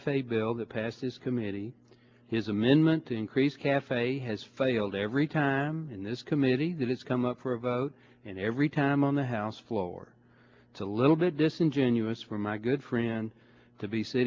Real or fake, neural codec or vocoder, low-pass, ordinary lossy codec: real; none; 7.2 kHz; Opus, 32 kbps